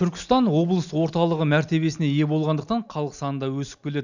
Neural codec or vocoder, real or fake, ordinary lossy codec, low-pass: none; real; none; 7.2 kHz